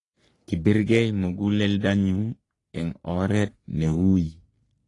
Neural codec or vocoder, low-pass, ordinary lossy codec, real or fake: codec, 44.1 kHz, 3.4 kbps, Pupu-Codec; 10.8 kHz; AAC, 32 kbps; fake